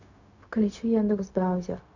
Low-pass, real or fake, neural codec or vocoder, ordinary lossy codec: 7.2 kHz; fake; codec, 16 kHz, 0.4 kbps, LongCat-Audio-Codec; none